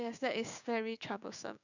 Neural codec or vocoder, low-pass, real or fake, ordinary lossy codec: codec, 16 kHz, 6 kbps, DAC; 7.2 kHz; fake; none